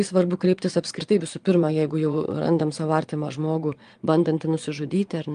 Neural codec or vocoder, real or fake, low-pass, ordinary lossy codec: vocoder, 22.05 kHz, 80 mel bands, Vocos; fake; 9.9 kHz; Opus, 32 kbps